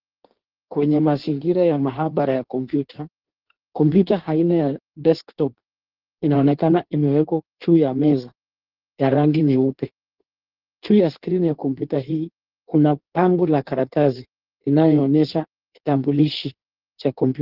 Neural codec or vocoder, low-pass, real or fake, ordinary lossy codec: codec, 16 kHz in and 24 kHz out, 1.1 kbps, FireRedTTS-2 codec; 5.4 kHz; fake; Opus, 16 kbps